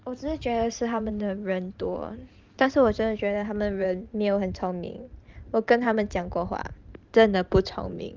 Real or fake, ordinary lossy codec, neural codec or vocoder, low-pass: fake; Opus, 32 kbps; vocoder, 44.1 kHz, 128 mel bands every 512 samples, BigVGAN v2; 7.2 kHz